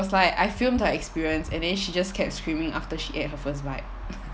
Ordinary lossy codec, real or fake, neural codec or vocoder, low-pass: none; real; none; none